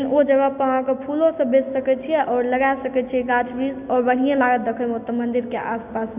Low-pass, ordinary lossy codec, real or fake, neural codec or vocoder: 3.6 kHz; none; real; none